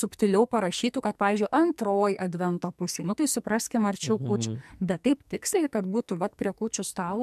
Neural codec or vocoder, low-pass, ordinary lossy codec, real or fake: codec, 44.1 kHz, 2.6 kbps, SNAC; 14.4 kHz; MP3, 96 kbps; fake